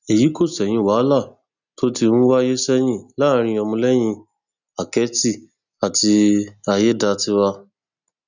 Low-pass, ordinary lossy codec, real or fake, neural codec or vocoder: 7.2 kHz; none; real; none